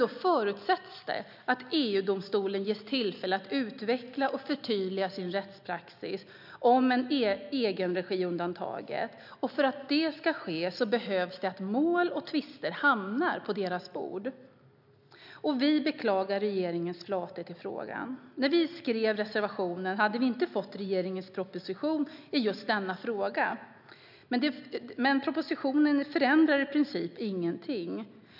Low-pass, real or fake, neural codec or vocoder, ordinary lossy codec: 5.4 kHz; real; none; none